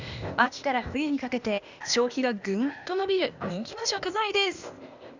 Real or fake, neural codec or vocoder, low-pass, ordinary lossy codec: fake; codec, 16 kHz, 0.8 kbps, ZipCodec; 7.2 kHz; Opus, 64 kbps